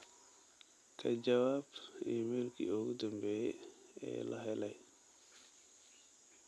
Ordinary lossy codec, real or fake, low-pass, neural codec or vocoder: none; real; none; none